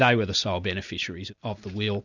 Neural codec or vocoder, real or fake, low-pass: none; real; 7.2 kHz